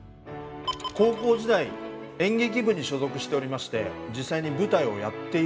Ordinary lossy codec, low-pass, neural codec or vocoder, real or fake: none; none; none; real